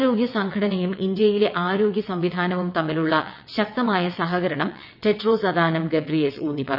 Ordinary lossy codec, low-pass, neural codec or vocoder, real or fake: none; 5.4 kHz; vocoder, 22.05 kHz, 80 mel bands, WaveNeXt; fake